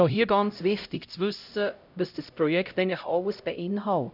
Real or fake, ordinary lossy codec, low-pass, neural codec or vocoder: fake; none; 5.4 kHz; codec, 16 kHz, 0.5 kbps, X-Codec, HuBERT features, trained on LibriSpeech